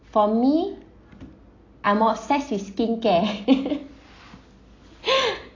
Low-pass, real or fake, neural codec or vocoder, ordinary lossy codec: 7.2 kHz; real; none; AAC, 32 kbps